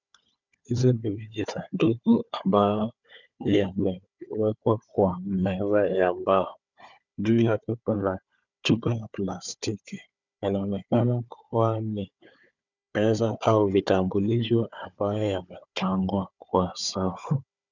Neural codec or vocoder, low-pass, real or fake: codec, 16 kHz, 4 kbps, FunCodec, trained on Chinese and English, 50 frames a second; 7.2 kHz; fake